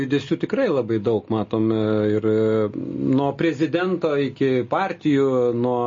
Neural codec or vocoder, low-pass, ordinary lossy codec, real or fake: none; 7.2 kHz; MP3, 32 kbps; real